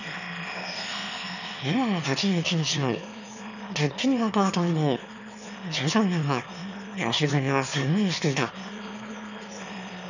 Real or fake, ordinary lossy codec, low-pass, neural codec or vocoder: fake; none; 7.2 kHz; autoencoder, 22.05 kHz, a latent of 192 numbers a frame, VITS, trained on one speaker